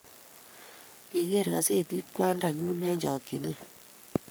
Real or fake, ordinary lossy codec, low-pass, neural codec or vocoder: fake; none; none; codec, 44.1 kHz, 3.4 kbps, Pupu-Codec